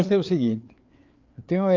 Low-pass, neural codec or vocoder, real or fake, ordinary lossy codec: 7.2 kHz; codec, 16 kHz, 4 kbps, FreqCodec, larger model; fake; Opus, 24 kbps